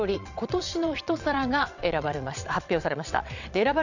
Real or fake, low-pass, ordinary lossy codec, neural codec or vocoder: fake; 7.2 kHz; none; vocoder, 22.05 kHz, 80 mel bands, WaveNeXt